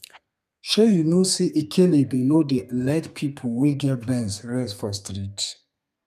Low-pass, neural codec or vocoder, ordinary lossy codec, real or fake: 14.4 kHz; codec, 32 kHz, 1.9 kbps, SNAC; none; fake